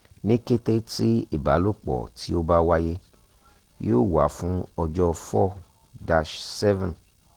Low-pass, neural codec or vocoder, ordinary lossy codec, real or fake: 19.8 kHz; none; Opus, 16 kbps; real